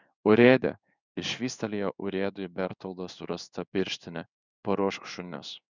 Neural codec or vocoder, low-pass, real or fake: codec, 16 kHz in and 24 kHz out, 1 kbps, XY-Tokenizer; 7.2 kHz; fake